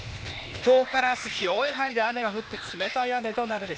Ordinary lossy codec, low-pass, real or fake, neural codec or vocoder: none; none; fake; codec, 16 kHz, 0.8 kbps, ZipCodec